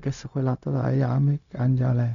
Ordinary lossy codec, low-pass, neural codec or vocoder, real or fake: none; 7.2 kHz; codec, 16 kHz, 0.4 kbps, LongCat-Audio-Codec; fake